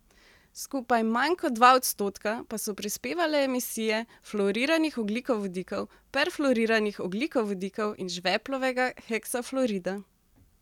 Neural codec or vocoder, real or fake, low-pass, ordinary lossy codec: none; real; 19.8 kHz; none